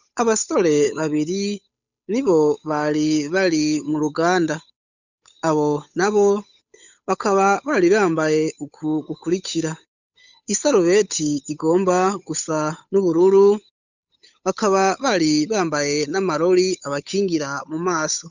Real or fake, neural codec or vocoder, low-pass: fake; codec, 16 kHz, 8 kbps, FunCodec, trained on Chinese and English, 25 frames a second; 7.2 kHz